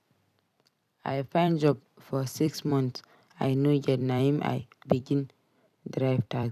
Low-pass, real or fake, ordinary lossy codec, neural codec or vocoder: 14.4 kHz; fake; none; vocoder, 44.1 kHz, 128 mel bands every 256 samples, BigVGAN v2